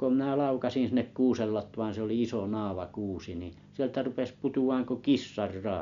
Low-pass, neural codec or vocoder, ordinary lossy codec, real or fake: 7.2 kHz; none; MP3, 64 kbps; real